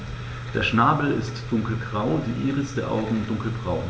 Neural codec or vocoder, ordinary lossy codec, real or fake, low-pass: none; none; real; none